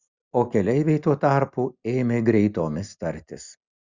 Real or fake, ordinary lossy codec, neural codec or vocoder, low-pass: real; Opus, 64 kbps; none; 7.2 kHz